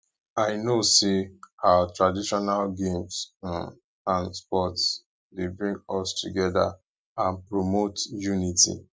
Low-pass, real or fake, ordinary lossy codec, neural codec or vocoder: none; real; none; none